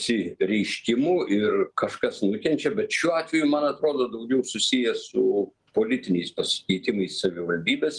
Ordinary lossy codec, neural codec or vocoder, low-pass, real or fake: Opus, 24 kbps; none; 10.8 kHz; real